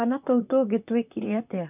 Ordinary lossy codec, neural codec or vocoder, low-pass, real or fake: none; vocoder, 44.1 kHz, 128 mel bands, Pupu-Vocoder; 3.6 kHz; fake